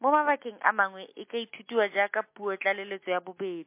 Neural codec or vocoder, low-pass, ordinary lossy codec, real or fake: none; 3.6 kHz; MP3, 24 kbps; real